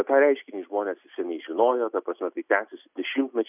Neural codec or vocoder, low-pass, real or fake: none; 3.6 kHz; real